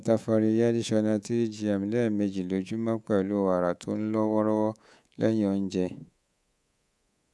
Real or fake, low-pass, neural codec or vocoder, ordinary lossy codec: fake; none; codec, 24 kHz, 3.1 kbps, DualCodec; none